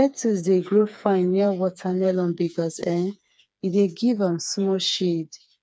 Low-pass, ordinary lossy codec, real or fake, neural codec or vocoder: none; none; fake; codec, 16 kHz, 4 kbps, FreqCodec, smaller model